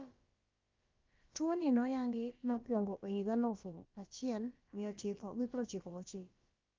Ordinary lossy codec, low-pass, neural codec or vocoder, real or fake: Opus, 24 kbps; 7.2 kHz; codec, 16 kHz, about 1 kbps, DyCAST, with the encoder's durations; fake